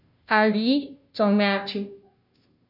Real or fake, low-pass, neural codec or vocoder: fake; 5.4 kHz; codec, 16 kHz, 0.5 kbps, FunCodec, trained on Chinese and English, 25 frames a second